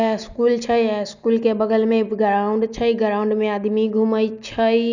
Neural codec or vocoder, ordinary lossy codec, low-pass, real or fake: none; none; 7.2 kHz; real